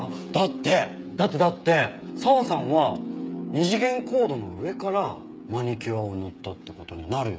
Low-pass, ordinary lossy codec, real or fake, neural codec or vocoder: none; none; fake; codec, 16 kHz, 8 kbps, FreqCodec, smaller model